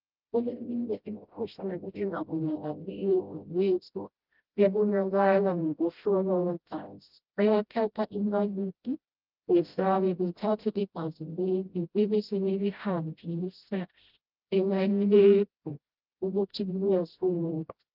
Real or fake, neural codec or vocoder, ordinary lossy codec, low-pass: fake; codec, 16 kHz, 0.5 kbps, FreqCodec, smaller model; Opus, 24 kbps; 5.4 kHz